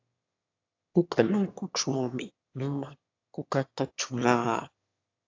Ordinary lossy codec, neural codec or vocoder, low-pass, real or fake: AAC, 48 kbps; autoencoder, 22.05 kHz, a latent of 192 numbers a frame, VITS, trained on one speaker; 7.2 kHz; fake